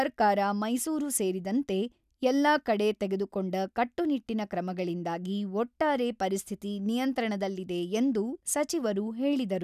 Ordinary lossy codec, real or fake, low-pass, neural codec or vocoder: none; real; 14.4 kHz; none